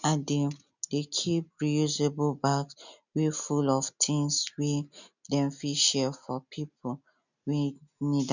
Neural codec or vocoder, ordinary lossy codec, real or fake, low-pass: none; none; real; 7.2 kHz